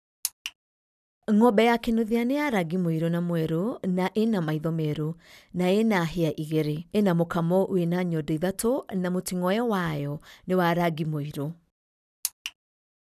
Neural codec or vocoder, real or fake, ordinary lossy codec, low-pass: none; real; none; 14.4 kHz